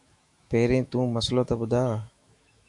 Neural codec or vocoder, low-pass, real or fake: autoencoder, 48 kHz, 128 numbers a frame, DAC-VAE, trained on Japanese speech; 10.8 kHz; fake